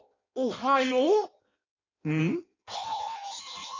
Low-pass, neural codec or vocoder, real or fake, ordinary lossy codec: 7.2 kHz; codec, 16 kHz in and 24 kHz out, 0.6 kbps, FireRedTTS-2 codec; fake; AAC, 32 kbps